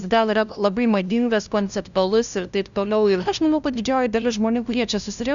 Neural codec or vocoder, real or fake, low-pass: codec, 16 kHz, 0.5 kbps, FunCodec, trained on LibriTTS, 25 frames a second; fake; 7.2 kHz